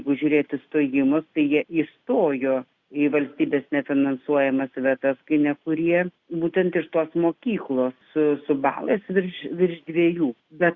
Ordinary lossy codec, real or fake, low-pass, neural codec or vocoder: Opus, 64 kbps; real; 7.2 kHz; none